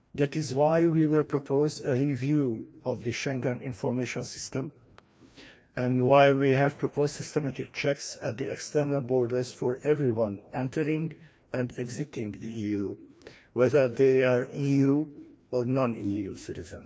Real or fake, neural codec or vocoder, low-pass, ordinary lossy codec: fake; codec, 16 kHz, 1 kbps, FreqCodec, larger model; none; none